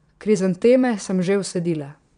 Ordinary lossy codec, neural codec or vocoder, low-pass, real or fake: none; vocoder, 22.05 kHz, 80 mel bands, Vocos; 9.9 kHz; fake